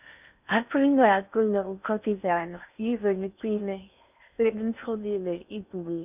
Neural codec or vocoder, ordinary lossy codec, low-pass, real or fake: codec, 16 kHz in and 24 kHz out, 0.6 kbps, FocalCodec, streaming, 4096 codes; none; 3.6 kHz; fake